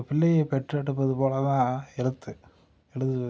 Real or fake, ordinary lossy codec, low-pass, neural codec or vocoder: real; none; none; none